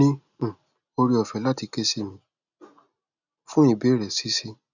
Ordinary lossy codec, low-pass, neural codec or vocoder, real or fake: none; 7.2 kHz; none; real